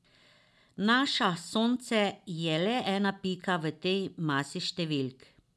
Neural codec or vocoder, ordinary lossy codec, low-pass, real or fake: none; none; none; real